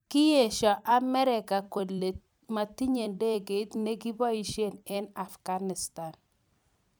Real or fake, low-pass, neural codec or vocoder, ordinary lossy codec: fake; none; vocoder, 44.1 kHz, 128 mel bands every 256 samples, BigVGAN v2; none